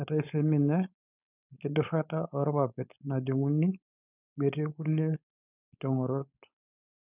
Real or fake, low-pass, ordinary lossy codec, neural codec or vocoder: fake; 3.6 kHz; none; codec, 16 kHz, 4.8 kbps, FACodec